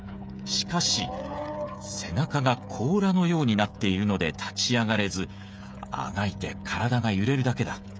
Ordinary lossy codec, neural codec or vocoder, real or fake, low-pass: none; codec, 16 kHz, 16 kbps, FreqCodec, smaller model; fake; none